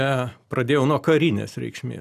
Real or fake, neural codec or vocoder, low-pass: real; none; 14.4 kHz